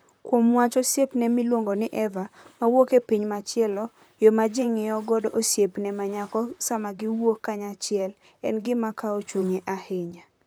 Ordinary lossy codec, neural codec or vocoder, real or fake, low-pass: none; vocoder, 44.1 kHz, 128 mel bands, Pupu-Vocoder; fake; none